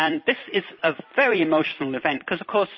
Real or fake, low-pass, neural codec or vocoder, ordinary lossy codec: fake; 7.2 kHz; vocoder, 44.1 kHz, 128 mel bands every 256 samples, BigVGAN v2; MP3, 24 kbps